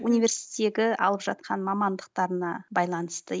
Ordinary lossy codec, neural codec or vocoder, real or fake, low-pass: none; none; real; none